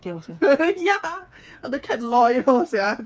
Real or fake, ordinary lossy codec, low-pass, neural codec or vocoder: fake; none; none; codec, 16 kHz, 4 kbps, FreqCodec, smaller model